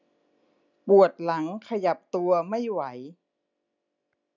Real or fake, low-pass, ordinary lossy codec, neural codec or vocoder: real; 7.2 kHz; none; none